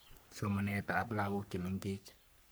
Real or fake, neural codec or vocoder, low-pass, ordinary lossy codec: fake; codec, 44.1 kHz, 3.4 kbps, Pupu-Codec; none; none